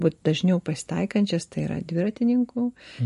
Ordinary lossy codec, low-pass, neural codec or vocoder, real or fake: MP3, 48 kbps; 14.4 kHz; none; real